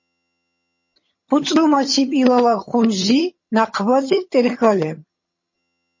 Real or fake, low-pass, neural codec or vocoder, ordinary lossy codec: fake; 7.2 kHz; vocoder, 22.05 kHz, 80 mel bands, HiFi-GAN; MP3, 32 kbps